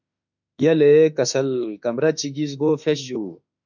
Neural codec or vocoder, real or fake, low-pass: autoencoder, 48 kHz, 32 numbers a frame, DAC-VAE, trained on Japanese speech; fake; 7.2 kHz